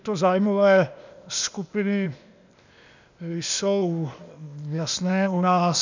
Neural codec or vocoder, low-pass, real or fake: codec, 16 kHz, 0.8 kbps, ZipCodec; 7.2 kHz; fake